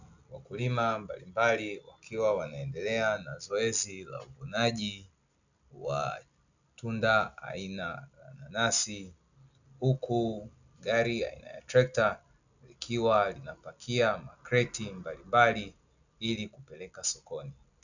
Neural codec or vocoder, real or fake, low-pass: none; real; 7.2 kHz